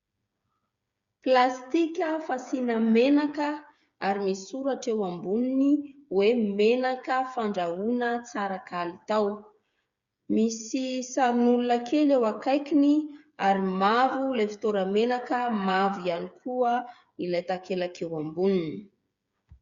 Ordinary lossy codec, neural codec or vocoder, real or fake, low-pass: Opus, 64 kbps; codec, 16 kHz, 8 kbps, FreqCodec, smaller model; fake; 7.2 kHz